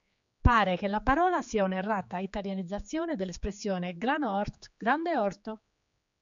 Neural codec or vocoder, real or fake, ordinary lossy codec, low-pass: codec, 16 kHz, 4 kbps, X-Codec, HuBERT features, trained on general audio; fake; MP3, 64 kbps; 7.2 kHz